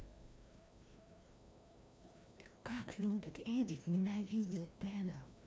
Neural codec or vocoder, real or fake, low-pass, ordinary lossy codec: codec, 16 kHz, 1 kbps, FreqCodec, larger model; fake; none; none